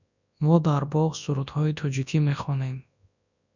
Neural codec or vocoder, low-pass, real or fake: codec, 24 kHz, 0.9 kbps, WavTokenizer, large speech release; 7.2 kHz; fake